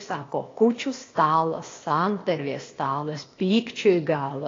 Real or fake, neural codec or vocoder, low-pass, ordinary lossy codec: fake; codec, 16 kHz, 0.8 kbps, ZipCodec; 7.2 kHz; AAC, 32 kbps